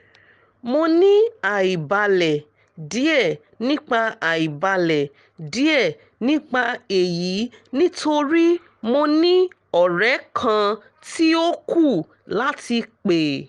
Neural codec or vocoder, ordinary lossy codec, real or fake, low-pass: none; Opus, 24 kbps; real; 9.9 kHz